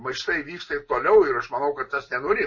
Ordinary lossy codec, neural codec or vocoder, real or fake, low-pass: MP3, 32 kbps; none; real; 7.2 kHz